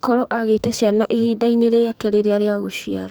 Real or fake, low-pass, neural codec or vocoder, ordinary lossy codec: fake; none; codec, 44.1 kHz, 2.6 kbps, SNAC; none